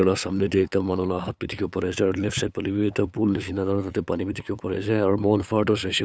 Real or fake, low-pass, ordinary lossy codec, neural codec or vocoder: fake; none; none; codec, 16 kHz, 8 kbps, FunCodec, trained on LibriTTS, 25 frames a second